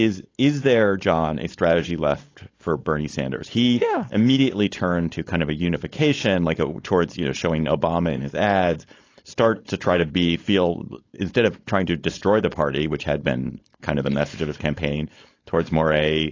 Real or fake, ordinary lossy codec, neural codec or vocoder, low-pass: fake; AAC, 32 kbps; codec, 16 kHz, 4.8 kbps, FACodec; 7.2 kHz